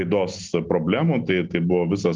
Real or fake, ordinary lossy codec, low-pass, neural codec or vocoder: real; Opus, 24 kbps; 7.2 kHz; none